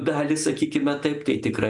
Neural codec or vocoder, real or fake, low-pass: none; real; 10.8 kHz